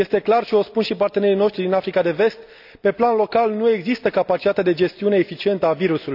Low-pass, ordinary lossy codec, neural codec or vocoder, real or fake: 5.4 kHz; none; none; real